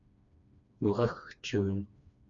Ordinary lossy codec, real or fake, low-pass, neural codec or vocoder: MP3, 64 kbps; fake; 7.2 kHz; codec, 16 kHz, 2 kbps, FreqCodec, smaller model